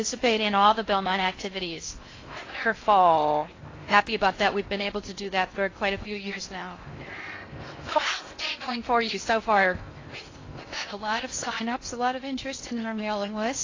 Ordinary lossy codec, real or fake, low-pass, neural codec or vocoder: AAC, 32 kbps; fake; 7.2 kHz; codec, 16 kHz in and 24 kHz out, 0.6 kbps, FocalCodec, streaming, 4096 codes